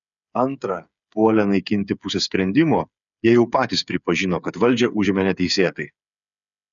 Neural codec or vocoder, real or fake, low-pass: codec, 16 kHz, 8 kbps, FreqCodec, smaller model; fake; 7.2 kHz